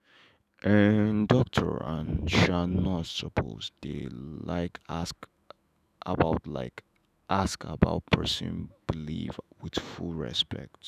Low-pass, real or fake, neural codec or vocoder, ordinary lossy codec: 14.4 kHz; fake; autoencoder, 48 kHz, 128 numbers a frame, DAC-VAE, trained on Japanese speech; none